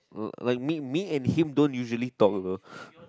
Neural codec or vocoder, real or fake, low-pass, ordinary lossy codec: none; real; none; none